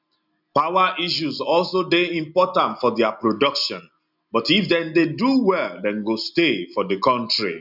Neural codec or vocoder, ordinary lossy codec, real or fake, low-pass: none; none; real; 5.4 kHz